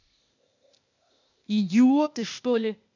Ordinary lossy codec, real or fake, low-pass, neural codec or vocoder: none; fake; 7.2 kHz; codec, 16 kHz, 0.8 kbps, ZipCodec